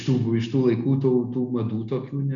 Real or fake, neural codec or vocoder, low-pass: real; none; 7.2 kHz